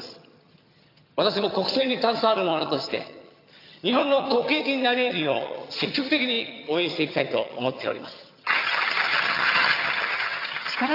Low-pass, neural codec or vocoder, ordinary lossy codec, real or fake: 5.4 kHz; vocoder, 22.05 kHz, 80 mel bands, HiFi-GAN; AAC, 32 kbps; fake